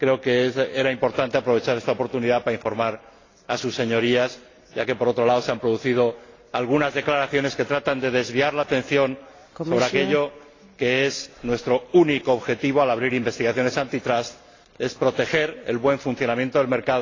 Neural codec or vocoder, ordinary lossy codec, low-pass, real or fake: none; AAC, 32 kbps; 7.2 kHz; real